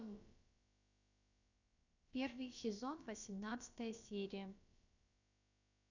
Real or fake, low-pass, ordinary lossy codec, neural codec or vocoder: fake; 7.2 kHz; none; codec, 16 kHz, about 1 kbps, DyCAST, with the encoder's durations